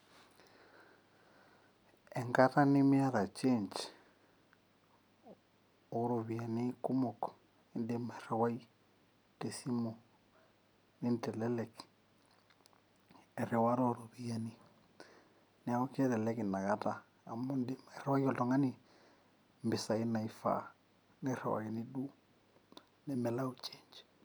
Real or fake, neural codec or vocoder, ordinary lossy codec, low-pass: real; none; none; none